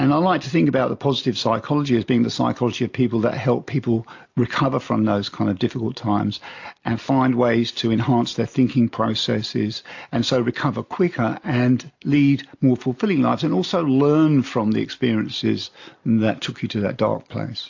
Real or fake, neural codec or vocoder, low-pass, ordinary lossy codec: real; none; 7.2 kHz; AAC, 48 kbps